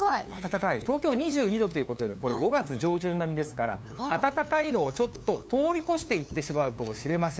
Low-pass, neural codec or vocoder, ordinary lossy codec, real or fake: none; codec, 16 kHz, 2 kbps, FunCodec, trained on LibriTTS, 25 frames a second; none; fake